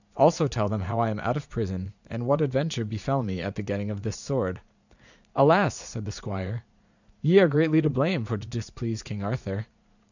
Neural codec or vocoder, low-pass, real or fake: vocoder, 44.1 kHz, 80 mel bands, Vocos; 7.2 kHz; fake